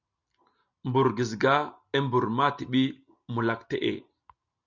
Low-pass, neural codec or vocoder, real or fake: 7.2 kHz; none; real